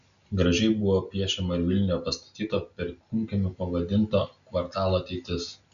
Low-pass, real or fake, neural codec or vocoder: 7.2 kHz; real; none